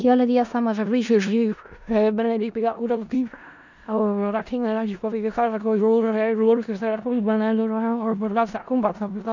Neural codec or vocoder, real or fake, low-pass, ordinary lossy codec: codec, 16 kHz in and 24 kHz out, 0.4 kbps, LongCat-Audio-Codec, four codebook decoder; fake; 7.2 kHz; none